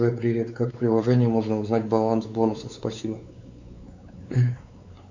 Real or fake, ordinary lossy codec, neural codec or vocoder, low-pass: fake; MP3, 64 kbps; codec, 16 kHz, 4 kbps, X-Codec, WavLM features, trained on Multilingual LibriSpeech; 7.2 kHz